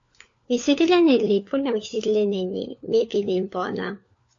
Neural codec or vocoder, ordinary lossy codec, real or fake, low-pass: codec, 16 kHz, 4 kbps, FunCodec, trained on LibriTTS, 50 frames a second; AAC, 48 kbps; fake; 7.2 kHz